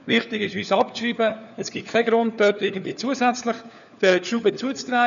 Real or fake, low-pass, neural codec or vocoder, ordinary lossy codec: fake; 7.2 kHz; codec, 16 kHz, 4 kbps, FunCodec, trained on LibriTTS, 50 frames a second; none